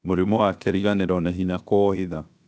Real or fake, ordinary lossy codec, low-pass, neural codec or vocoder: fake; none; none; codec, 16 kHz, 0.7 kbps, FocalCodec